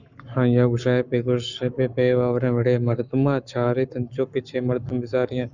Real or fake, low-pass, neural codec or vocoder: fake; 7.2 kHz; codec, 44.1 kHz, 7.8 kbps, Pupu-Codec